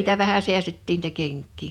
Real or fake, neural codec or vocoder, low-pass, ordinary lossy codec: fake; vocoder, 48 kHz, 128 mel bands, Vocos; 19.8 kHz; none